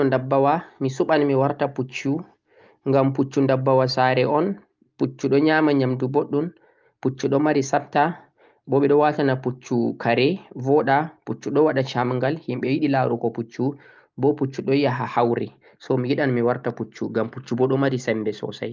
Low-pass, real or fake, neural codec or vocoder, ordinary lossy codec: 7.2 kHz; real; none; Opus, 24 kbps